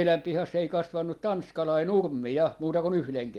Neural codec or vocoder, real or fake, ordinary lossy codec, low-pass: none; real; Opus, 24 kbps; 19.8 kHz